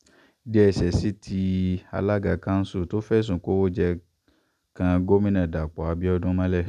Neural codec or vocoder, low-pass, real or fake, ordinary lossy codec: none; 14.4 kHz; real; none